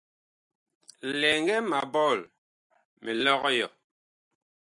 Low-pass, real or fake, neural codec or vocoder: 10.8 kHz; real; none